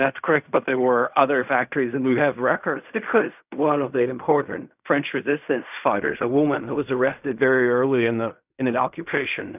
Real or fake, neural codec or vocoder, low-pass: fake; codec, 16 kHz in and 24 kHz out, 0.4 kbps, LongCat-Audio-Codec, fine tuned four codebook decoder; 3.6 kHz